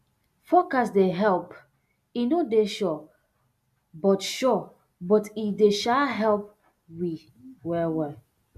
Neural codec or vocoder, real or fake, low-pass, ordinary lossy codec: none; real; 14.4 kHz; MP3, 96 kbps